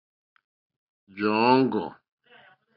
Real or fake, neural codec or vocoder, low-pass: real; none; 5.4 kHz